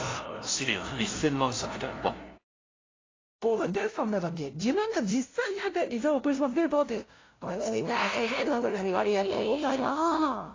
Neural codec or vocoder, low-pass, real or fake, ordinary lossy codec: codec, 16 kHz, 0.5 kbps, FunCodec, trained on LibriTTS, 25 frames a second; 7.2 kHz; fake; AAC, 32 kbps